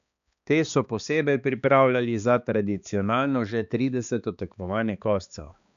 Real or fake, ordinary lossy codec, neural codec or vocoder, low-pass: fake; none; codec, 16 kHz, 2 kbps, X-Codec, HuBERT features, trained on balanced general audio; 7.2 kHz